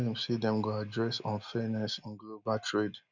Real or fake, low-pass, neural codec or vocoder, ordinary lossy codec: real; 7.2 kHz; none; none